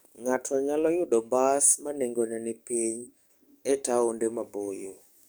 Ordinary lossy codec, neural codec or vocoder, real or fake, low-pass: none; codec, 44.1 kHz, 7.8 kbps, DAC; fake; none